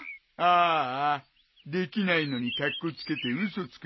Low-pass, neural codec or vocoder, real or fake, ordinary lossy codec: 7.2 kHz; none; real; MP3, 24 kbps